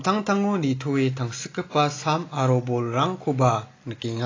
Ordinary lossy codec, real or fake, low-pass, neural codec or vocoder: AAC, 32 kbps; real; 7.2 kHz; none